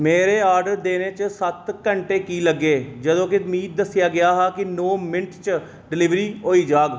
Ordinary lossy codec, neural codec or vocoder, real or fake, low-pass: none; none; real; none